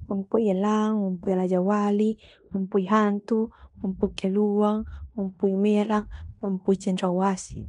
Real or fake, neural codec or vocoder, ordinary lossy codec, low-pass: fake; codec, 16 kHz in and 24 kHz out, 0.9 kbps, LongCat-Audio-Codec, fine tuned four codebook decoder; none; 10.8 kHz